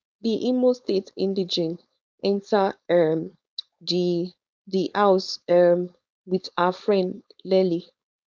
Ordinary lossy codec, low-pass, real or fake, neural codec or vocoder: none; none; fake; codec, 16 kHz, 4.8 kbps, FACodec